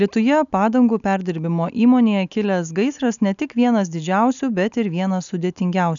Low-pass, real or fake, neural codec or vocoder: 7.2 kHz; real; none